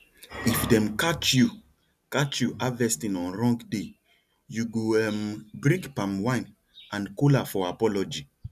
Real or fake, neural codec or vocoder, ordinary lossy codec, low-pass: real; none; none; 14.4 kHz